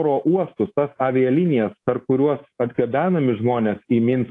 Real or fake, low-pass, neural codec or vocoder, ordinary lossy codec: fake; 10.8 kHz; codec, 24 kHz, 3.1 kbps, DualCodec; AAC, 48 kbps